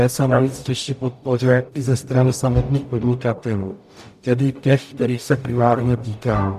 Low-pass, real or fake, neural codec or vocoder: 14.4 kHz; fake; codec, 44.1 kHz, 0.9 kbps, DAC